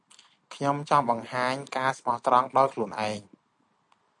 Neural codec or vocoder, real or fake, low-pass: none; real; 10.8 kHz